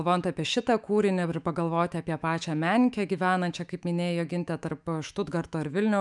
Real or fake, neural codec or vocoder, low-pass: fake; autoencoder, 48 kHz, 128 numbers a frame, DAC-VAE, trained on Japanese speech; 10.8 kHz